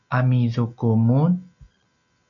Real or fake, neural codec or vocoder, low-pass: real; none; 7.2 kHz